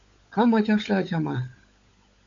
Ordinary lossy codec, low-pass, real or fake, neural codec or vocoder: AAC, 64 kbps; 7.2 kHz; fake; codec, 16 kHz, 16 kbps, FunCodec, trained on LibriTTS, 50 frames a second